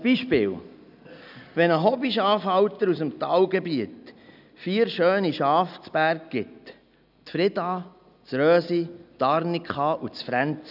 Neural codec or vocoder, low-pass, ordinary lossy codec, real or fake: none; 5.4 kHz; none; real